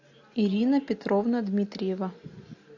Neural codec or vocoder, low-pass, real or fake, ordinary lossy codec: none; 7.2 kHz; real; AAC, 48 kbps